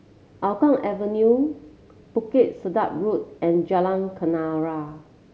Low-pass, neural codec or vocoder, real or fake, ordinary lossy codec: none; none; real; none